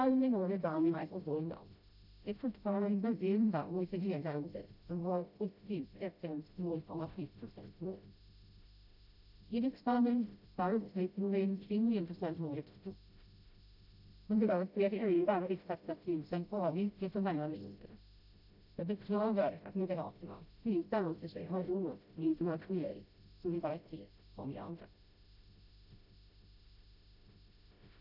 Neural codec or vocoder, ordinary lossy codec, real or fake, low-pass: codec, 16 kHz, 0.5 kbps, FreqCodec, smaller model; none; fake; 5.4 kHz